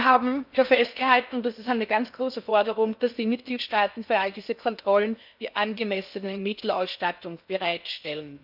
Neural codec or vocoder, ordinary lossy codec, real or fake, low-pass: codec, 16 kHz in and 24 kHz out, 0.6 kbps, FocalCodec, streaming, 4096 codes; none; fake; 5.4 kHz